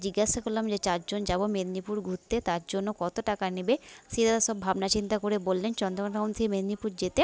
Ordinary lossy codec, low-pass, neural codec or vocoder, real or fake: none; none; none; real